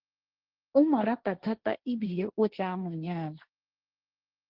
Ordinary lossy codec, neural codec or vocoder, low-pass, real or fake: Opus, 16 kbps; codec, 16 kHz, 1.1 kbps, Voila-Tokenizer; 5.4 kHz; fake